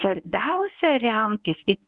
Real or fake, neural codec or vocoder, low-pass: fake; codec, 24 kHz, 0.9 kbps, WavTokenizer, medium speech release version 1; 10.8 kHz